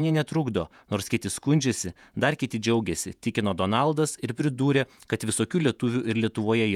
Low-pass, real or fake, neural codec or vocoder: 19.8 kHz; fake; vocoder, 48 kHz, 128 mel bands, Vocos